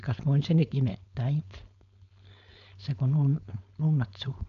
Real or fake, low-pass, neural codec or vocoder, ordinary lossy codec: fake; 7.2 kHz; codec, 16 kHz, 4.8 kbps, FACodec; none